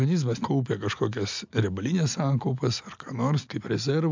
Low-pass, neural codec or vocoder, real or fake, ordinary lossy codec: 7.2 kHz; none; real; MP3, 64 kbps